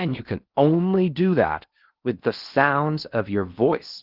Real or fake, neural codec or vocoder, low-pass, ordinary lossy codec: fake; codec, 16 kHz in and 24 kHz out, 0.8 kbps, FocalCodec, streaming, 65536 codes; 5.4 kHz; Opus, 32 kbps